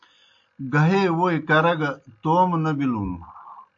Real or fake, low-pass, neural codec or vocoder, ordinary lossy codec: real; 7.2 kHz; none; AAC, 64 kbps